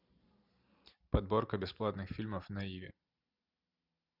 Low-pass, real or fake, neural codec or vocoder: 5.4 kHz; real; none